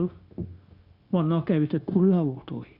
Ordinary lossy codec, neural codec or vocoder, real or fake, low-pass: Opus, 64 kbps; codec, 16 kHz, 0.9 kbps, LongCat-Audio-Codec; fake; 5.4 kHz